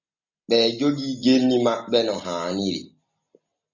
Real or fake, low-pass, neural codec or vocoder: real; 7.2 kHz; none